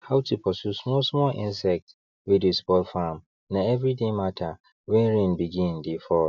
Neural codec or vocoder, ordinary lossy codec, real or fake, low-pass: none; none; real; 7.2 kHz